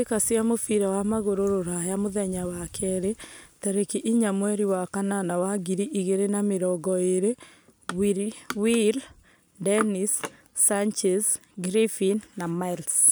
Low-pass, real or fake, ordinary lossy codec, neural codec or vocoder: none; real; none; none